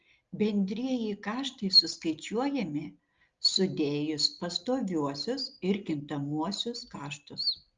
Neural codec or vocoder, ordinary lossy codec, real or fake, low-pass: none; Opus, 32 kbps; real; 7.2 kHz